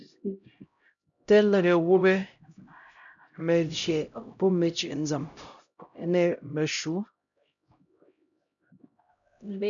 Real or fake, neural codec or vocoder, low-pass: fake; codec, 16 kHz, 0.5 kbps, X-Codec, HuBERT features, trained on LibriSpeech; 7.2 kHz